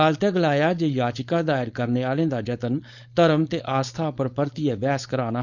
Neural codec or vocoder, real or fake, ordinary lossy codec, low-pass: codec, 16 kHz, 4.8 kbps, FACodec; fake; none; 7.2 kHz